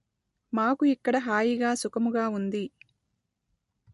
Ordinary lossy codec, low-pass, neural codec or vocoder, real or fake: MP3, 48 kbps; 14.4 kHz; none; real